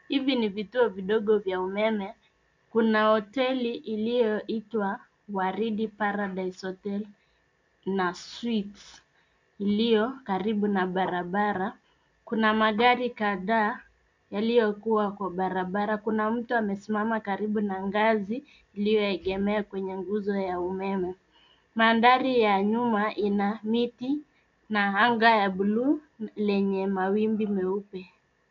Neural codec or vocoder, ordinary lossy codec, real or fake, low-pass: none; MP3, 64 kbps; real; 7.2 kHz